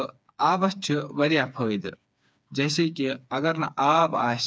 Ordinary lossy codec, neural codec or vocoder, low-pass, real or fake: none; codec, 16 kHz, 4 kbps, FreqCodec, smaller model; none; fake